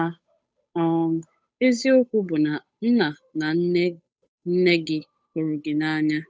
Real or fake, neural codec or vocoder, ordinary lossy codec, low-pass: fake; codec, 16 kHz, 8 kbps, FunCodec, trained on Chinese and English, 25 frames a second; none; none